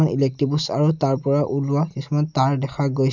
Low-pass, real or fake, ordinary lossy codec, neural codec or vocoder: 7.2 kHz; real; none; none